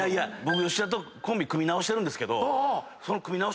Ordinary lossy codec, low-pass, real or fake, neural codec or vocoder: none; none; real; none